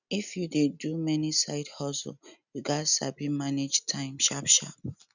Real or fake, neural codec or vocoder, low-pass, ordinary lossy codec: real; none; 7.2 kHz; none